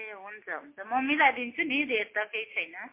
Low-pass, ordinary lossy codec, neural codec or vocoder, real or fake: 3.6 kHz; MP3, 24 kbps; none; real